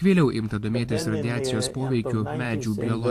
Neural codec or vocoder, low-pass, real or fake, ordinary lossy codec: vocoder, 44.1 kHz, 128 mel bands every 512 samples, BigVGAN v2; 14.4 kHz; fake; AAC, 64 kbps